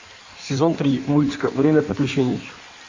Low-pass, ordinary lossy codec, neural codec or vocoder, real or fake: 7.2 kHz; MP3, 48 kbps; codec, 16 kHz in and 24 kHz out, 1.1 kbps, FireRedTTS-2 codec; fake